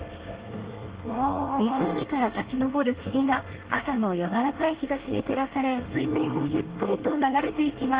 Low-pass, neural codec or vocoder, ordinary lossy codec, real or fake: 3.6 kHz; codec, 24 kHz, 1 kbps, SNAC; Opus, 16 kbps; fake